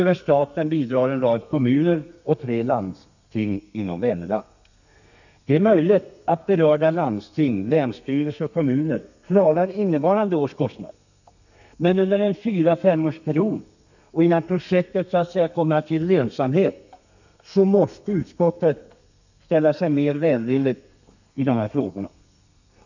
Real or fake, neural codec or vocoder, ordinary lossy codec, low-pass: fake; codec, 32 kHz, 1.9 kbps, SNAC; none; 7.2 kHz